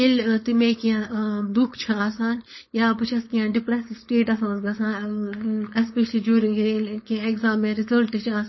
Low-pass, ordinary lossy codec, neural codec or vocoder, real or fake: 7.2 kHz; MP3, 24 kbps; codec, 16 kHz, 4.8 kbps, FACodec; fake